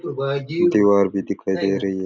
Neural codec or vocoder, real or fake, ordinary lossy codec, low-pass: none; real; none; none